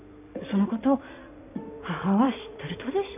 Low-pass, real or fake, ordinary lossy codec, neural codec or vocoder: 3.6 kHz; fake; none; codec, 16 kHz in and 24 kHz out, 2.2 kbps, FireRedTTS-2 codec